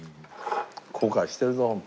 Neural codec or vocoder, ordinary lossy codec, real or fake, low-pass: none; none; real; none